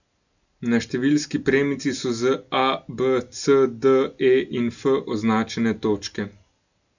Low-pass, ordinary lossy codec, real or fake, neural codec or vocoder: 7.2 kHz; none; real; none